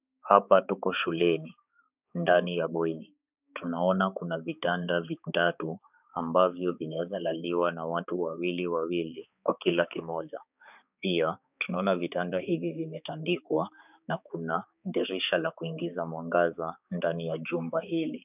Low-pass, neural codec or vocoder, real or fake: 3.6 kHz; codec, 16 kHz, 4 kbps, X-Codec, HuBERT features, trained on balanced general audio; fake